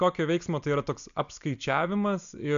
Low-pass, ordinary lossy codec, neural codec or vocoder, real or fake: 7.2 kHz; MP3, 64 kbps; none; real